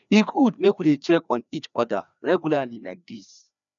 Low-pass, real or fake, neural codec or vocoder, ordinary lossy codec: 7.2 kHz; fake; codec, 16 kHz, 2 kbps, FreqCodec, larger model; none